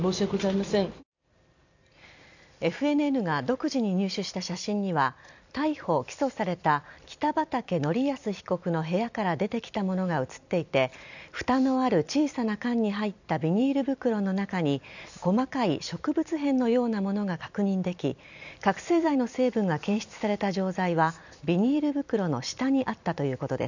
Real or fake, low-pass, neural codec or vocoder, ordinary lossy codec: fake; 7.2 kHz; vocoder, 44.1 kHz, 128 mel bands every 256 samples, BigVGAN v2; none